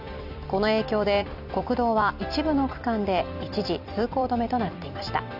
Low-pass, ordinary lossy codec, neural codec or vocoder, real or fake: 5.4 kHz; none; vocoder, 44.1 kHz, 128 mel bands every 256 samples, BigVGAN v2; fake